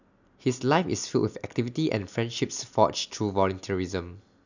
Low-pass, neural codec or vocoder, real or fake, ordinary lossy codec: 7.2 kHz; none; real; none